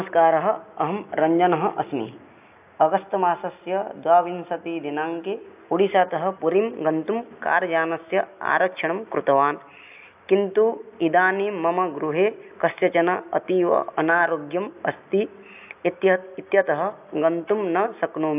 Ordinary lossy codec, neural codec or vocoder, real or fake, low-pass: AAC, 32 kbps; none; real; 3.6 kHz